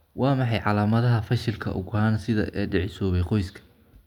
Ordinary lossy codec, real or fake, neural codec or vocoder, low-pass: none; real; none; 19.8 kHz